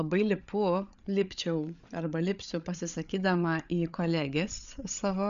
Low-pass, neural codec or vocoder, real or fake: 7.2 kHz; codec, 16 kHz, 8 kbps, FreqCodec, larger model; fake